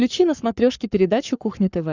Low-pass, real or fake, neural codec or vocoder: 7.2 kHz; fake; codec, 44.1 kHz, 7.8 kbps, Pupu-Codec